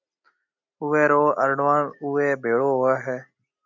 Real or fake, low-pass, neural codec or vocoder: real; 7.2 kHz; none